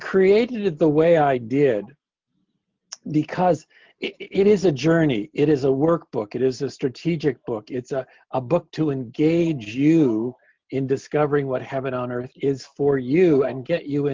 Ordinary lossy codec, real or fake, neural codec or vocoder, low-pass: Opus, 24 kbps; real; none; 7.2 kHz